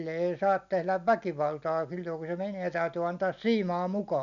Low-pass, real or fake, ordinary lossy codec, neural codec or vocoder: 7.2 kHz; real; none; none